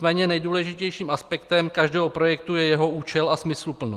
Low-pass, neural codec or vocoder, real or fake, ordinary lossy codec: 14.4 kHz; none; real; Opus, 24 kbps